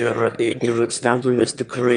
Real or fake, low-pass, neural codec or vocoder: fake; 9.9 kHz; autoencoder, 22.05 kHz, a latent of 192 numbers a frame, VITS, trained on one speaker